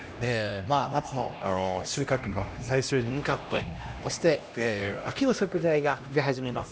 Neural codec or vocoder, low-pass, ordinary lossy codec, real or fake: codec, 16 kHz, 1 kbps, X-Codec, HuBERT features, trained on LibriSpeech; none; none; fake